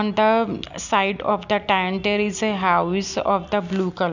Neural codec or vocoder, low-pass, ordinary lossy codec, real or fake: none; 7.2 kHz; none; real